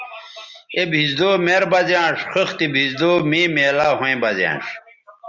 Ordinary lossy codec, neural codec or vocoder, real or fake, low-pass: Opus, 64 kbps; none; real; 7.2 kHz